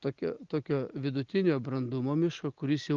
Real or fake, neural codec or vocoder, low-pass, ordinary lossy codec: real; none; 7.2 kHz; Opus, 24 kbps